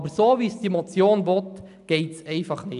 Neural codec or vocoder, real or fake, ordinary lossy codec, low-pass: none; real; none; 10.8 kHz